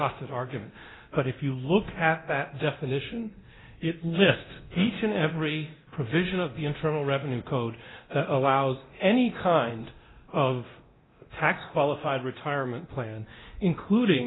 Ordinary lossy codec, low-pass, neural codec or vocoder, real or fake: AAC, 16 kbps; 7.2 kHz; codec, 24 kHz, 0.9 kbps, DualCodec; fake